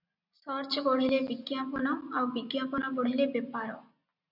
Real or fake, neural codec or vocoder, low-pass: real; none; 5.4 kHz